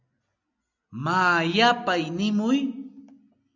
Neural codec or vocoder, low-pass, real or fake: none; 7.2 kHz; real